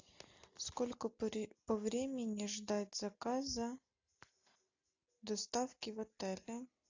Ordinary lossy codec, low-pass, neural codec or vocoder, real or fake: AAC, 48 kbps; 7.2 kHz; none; real